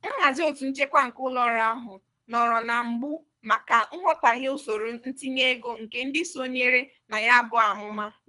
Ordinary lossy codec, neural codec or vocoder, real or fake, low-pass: none; codec, 24 kHz, 3 kbps, HILCodec; fake; 10.8 kHz